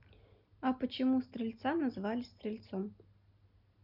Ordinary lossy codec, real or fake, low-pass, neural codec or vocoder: none; real; 5.4 kHz; none